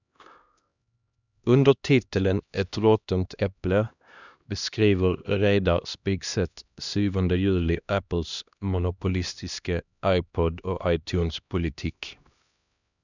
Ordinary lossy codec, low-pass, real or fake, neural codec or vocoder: none; 7.2 kHz; fake; codec, 16 kHz, 1 kbps, X-Codec, HuBERT features, trained on LibriSpeech